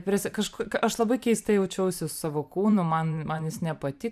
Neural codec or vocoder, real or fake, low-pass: vocoder, 44.1 kHz, 128 mel bands every 512 samples, BigVGAN v2; fake; 14.4 kHz